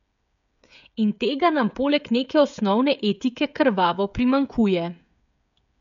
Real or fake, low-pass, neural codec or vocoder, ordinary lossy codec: fake; 7.2 kHz; codec, 16 kHz, 16 kbps, FreqCodec, smaller model; MP3, 96 kbps